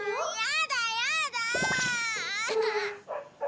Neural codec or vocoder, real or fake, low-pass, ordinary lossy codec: none; real; none; none